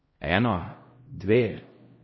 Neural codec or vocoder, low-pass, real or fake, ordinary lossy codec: codec, 16 kHz, 0.5 kbps, X-Codec, HuBERT features, trained on LibriSpeech; 7.2 kHz; fake; MP3, 24 kbps